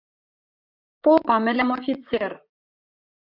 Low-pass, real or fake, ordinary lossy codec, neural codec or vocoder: 5.4 kHz; fake; AAC, 48 kbps; vocoder, 44.1 kHz, 128 mel bands, Pupu-Vocoder